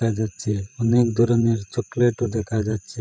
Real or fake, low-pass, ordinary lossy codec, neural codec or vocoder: fake; none; none; codec, 16 kHz, 16 kbps, FreqCodec, larger model